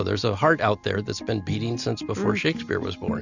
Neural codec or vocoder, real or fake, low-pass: none; real; 7.2 kHz